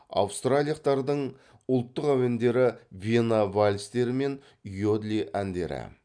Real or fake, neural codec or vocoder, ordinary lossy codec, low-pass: real; none; none; 9.9 kHz